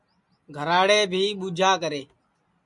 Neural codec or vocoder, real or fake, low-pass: none; real; 10.8 kHz